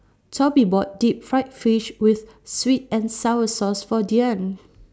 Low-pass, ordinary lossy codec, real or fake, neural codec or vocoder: none; none; real; none